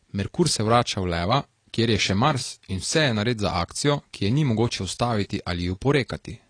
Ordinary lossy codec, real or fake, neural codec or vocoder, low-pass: AAC, 32 kbps; real; none; 9.9 kHz